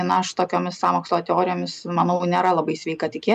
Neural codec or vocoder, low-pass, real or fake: none; 14.4 kHz; real